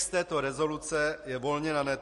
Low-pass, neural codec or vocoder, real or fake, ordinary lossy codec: 14.4 kHz; none; real; MP3, 48 kbps